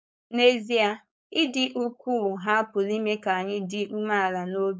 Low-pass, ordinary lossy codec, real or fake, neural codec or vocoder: none; none; fake; codec, 16 kHz, 4.8 kbps, FACodec